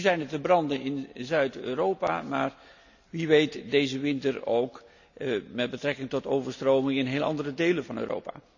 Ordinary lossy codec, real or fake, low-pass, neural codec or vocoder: none; real; 7.2 kHz; none